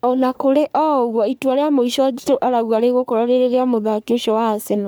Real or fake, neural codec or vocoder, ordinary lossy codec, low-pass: fake; codec, 44.1 kHz, 3.4 kbps, Pupu-Codec; none; none